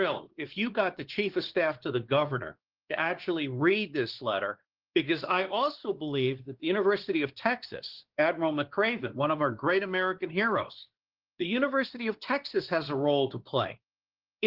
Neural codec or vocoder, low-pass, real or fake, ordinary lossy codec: codec, 16 kHz, 2 kbps, X-Codec, WavLM features, trained on Multilingual LibriSpeech; 5.4 kHz; fake; Opus, 16 kbps